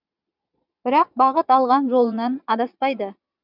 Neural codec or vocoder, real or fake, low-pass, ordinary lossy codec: vocoder, 22.05 kHz, 80 mel bands, Vocos; fake; 5.4 kHz; none